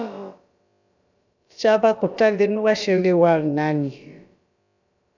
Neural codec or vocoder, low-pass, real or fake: codec, 16 kHz, about 1 kbps, DyCAST, with the encoder's durations; 7.2 kHz; fake